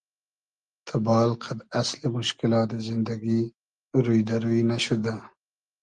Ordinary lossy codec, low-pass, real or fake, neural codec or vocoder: Opus, 24 kbps; 9.9 kHz; real; none